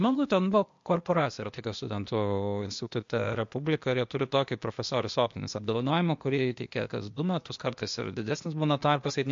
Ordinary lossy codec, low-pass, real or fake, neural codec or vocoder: MP3, 48 kbps; 7.2 kHz; fake; codec, 16 kHz, 0.8 kbps, ZipCodec